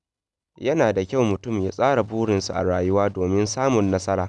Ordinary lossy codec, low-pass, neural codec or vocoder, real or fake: none; 10.8 kHz; none; real